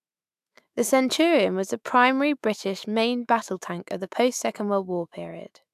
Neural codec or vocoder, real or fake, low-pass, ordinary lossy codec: autoencoder, 48 kHz, 128 numbers a frame, DAC-VAE, trained on Japanese speech; fake; 14.4 kHz; AAC, 96 kbps